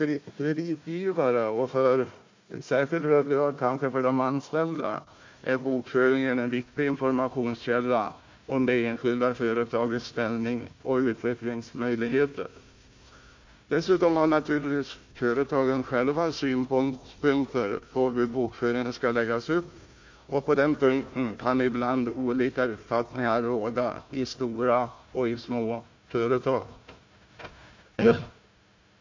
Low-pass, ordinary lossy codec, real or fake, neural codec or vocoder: 7.2 kHz; MP3, 48 kbps; fake; codec, 16 kHz, 1 kbps, FunCodec, trained on Chinese and English, 50 frames a second